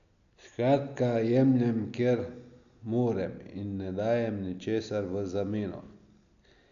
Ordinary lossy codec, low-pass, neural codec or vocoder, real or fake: none; 7.2 kHz; none; real